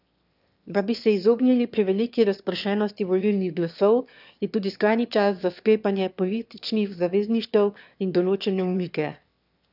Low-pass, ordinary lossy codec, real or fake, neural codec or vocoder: 5.4 kHz; none; fake; autoencoder, 22.05 kHz, a latent of 192 numbers a frame, VITS, trained on one speaker